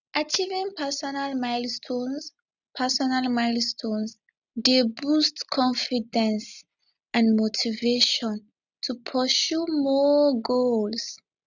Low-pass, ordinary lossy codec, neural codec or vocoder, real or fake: 7.2 kHz; none; none; real